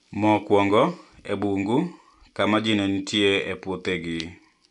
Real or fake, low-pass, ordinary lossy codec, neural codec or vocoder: real; 10.8 kHz; none; none